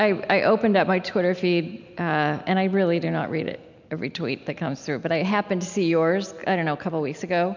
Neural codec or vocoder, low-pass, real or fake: none; 7.2 kHz; real